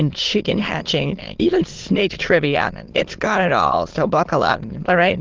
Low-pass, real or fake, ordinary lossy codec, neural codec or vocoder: 7.2 kHz; fake; Opus, 16 kbps; autoencoder, 22.05 kHz, a latent of 192 numbers a frame, VITS, trained on many speakers